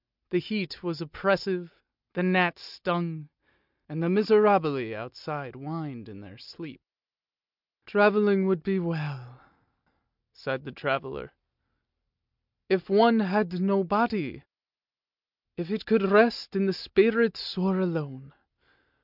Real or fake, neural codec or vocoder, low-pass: real; none; 5.4 kHz